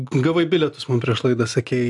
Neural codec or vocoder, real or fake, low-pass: none; real; 10.8 kHz